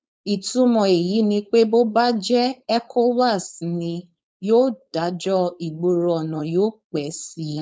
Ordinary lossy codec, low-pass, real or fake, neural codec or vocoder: none; none; fake; codec, 16 kHz, 4.8 kbps, FACodec